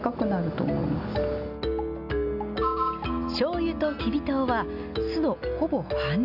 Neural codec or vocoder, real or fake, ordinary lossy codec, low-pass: none; real; none; 5.4 kHz